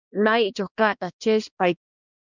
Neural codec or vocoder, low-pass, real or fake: codec, 16 kHz, 1 kbps, X-Codec, HuBERT features, trained on balanced general audio; 7.2 kHz; fake